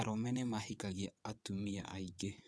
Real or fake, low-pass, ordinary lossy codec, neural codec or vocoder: fake; none; none; vocoder, 22.05 kHz, 80 mel bands, WaveNeXt